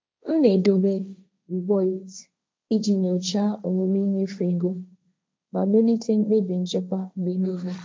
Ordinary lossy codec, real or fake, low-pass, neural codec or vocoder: none; fake; none; codec, 16 kHz, 1.1 kbps, Voila-Tokenizer